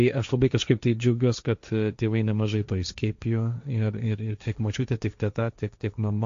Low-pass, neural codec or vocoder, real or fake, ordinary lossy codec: 7.2 kHz; codec, 16 kHz, 1.1 kbps, Voila-Tokenizer; fake; AAC, 64 kbps